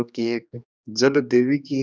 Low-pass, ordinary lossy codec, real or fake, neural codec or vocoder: none; none; fake; codec, 16 kHz, 2 kbps, X-Codec, HuBERT features, trained on general audio